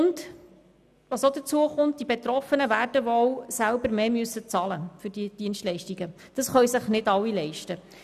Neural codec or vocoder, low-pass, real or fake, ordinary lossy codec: none; 14.4 kHz; real; none